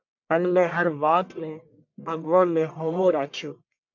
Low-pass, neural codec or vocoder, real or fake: 7.2 kHz; codec, 44.1 kHz, 1.7 kbps, Pupu-Codec; fake